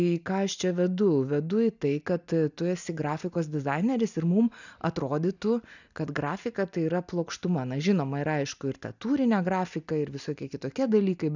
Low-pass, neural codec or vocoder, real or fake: 7.2 kHz; none; real